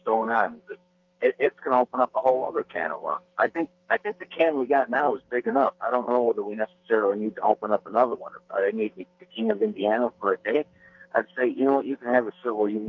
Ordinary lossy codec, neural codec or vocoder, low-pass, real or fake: Opus, 24 kbps; codec, 44.1 kHz, 2.6 kbps, SNAC; 7.2 kHz; fake